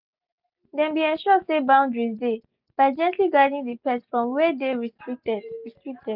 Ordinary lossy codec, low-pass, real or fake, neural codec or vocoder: none; 5.4 kHz; real; none